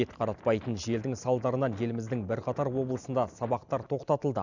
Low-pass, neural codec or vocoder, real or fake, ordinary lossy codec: 7.2 kHz; none; real; none